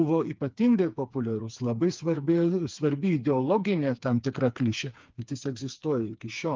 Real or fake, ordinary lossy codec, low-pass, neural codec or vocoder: fake; Opus, 24 kbps; 7.2 kHz; codec, 16 kHz, 4 kbps, FreqCodec, smaller model